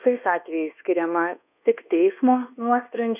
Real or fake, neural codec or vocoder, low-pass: fake; codec, 24 kHz, 1.2 kbps, DualCodec; 3.6 kHz